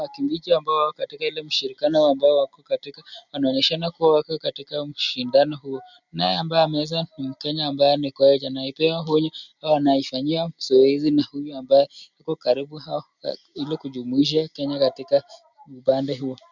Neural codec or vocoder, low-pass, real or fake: none; 7.2 kHz; real